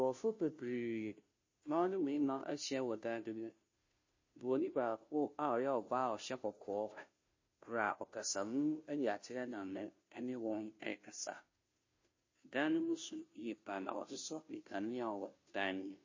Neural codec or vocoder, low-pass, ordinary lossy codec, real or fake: codec, 16 kHz, 0.5 kbps, FunCodec, trained on Chinese and English, 25 frames a second; 7.2 kHz; MP3, 32 kbps; fake